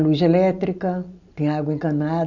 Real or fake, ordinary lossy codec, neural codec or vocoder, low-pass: real; none; none; 7.2 kHz